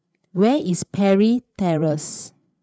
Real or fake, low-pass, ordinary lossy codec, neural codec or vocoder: fake; none; none; codec, 16 kHz, 8 kbps, FreqCodec, larger model